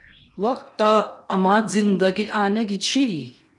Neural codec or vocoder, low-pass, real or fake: codec, 16 kHz in and 24 kHz out, 0.8 kbps, FocalCodec, streaming, 65536 codes; 10.8 kHz; fake